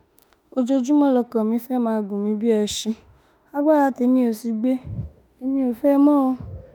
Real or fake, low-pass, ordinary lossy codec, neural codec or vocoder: fake; none; none; autoencoder, 48 kHz, 32 numbers a frame, DAC-VAE, trained on Japanese speech